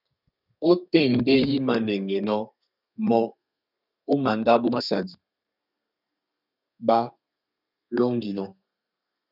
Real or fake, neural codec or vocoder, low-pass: fake; codec, 32 kHz, 1.9 kbps, SNAC; 5.4 kHz